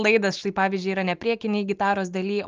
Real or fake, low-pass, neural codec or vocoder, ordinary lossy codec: real; 7.2 kHz; none; Opus, 32 kbps